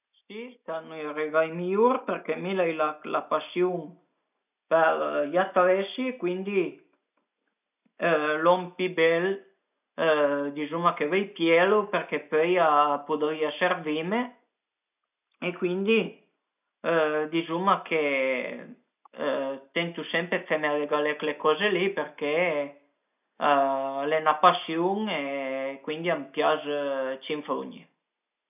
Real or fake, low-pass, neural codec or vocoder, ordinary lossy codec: real; 3.6 kHz; none; none